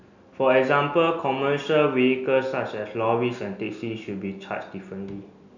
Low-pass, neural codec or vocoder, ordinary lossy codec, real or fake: 7.2 kHz; none; none; real